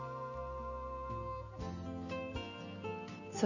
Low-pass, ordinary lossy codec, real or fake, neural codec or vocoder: 7.2 kHz; none; real; none